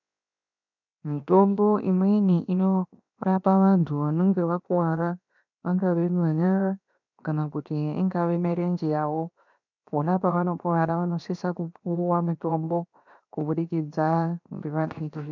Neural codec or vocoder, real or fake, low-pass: codec, 16 kHz, 0.7 kbps, FocalCodec; fake; 7.2 kHz